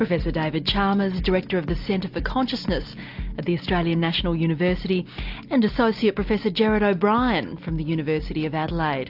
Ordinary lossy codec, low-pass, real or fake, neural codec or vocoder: AAC, 48 kbps; 5.4 kHz; real; none